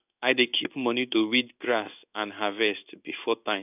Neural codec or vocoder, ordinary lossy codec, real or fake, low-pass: codec, 16 kHz in and 24 kHz out, 1 kbps, XY-Tokenizer; none; fake; 3.6 kHz